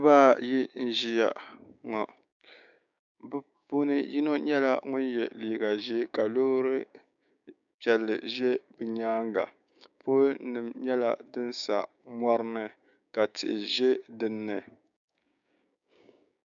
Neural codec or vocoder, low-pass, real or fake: codec, 16 kHz, 6 kbps, DAC; 7.2 kHz; fake